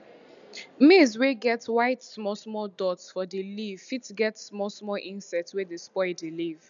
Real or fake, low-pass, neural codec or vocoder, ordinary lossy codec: real; 7.2 kHz; none; none